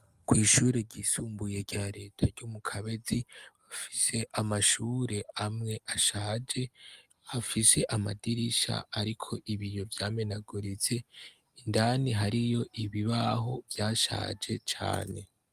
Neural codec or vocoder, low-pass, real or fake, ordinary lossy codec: none; 14.4 kHz; real; Opus, 32 kbps